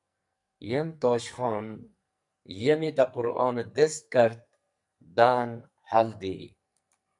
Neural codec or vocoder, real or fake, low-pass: codec, 44.1 kHz, 2.6 kbps, SNAC; fake; 10.8 kHz